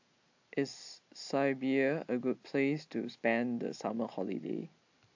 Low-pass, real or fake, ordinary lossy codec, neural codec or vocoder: 7.2 kHz; real; AAC, 48 kbps; none